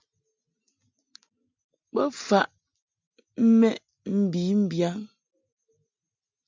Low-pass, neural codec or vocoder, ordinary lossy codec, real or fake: 7.2 kHz; none; MP3, 64 kbps; real